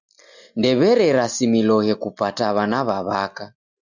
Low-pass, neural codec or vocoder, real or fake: 7.2 kHz; none; real